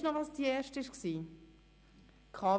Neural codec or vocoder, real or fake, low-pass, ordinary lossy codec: none; real; none; none